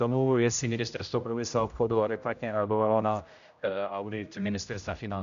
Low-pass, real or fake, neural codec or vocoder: 7.2 kHz; fake; codec, 16 kHz, 0.5 kbps, X-Codec, HuBERT features, trained on general audio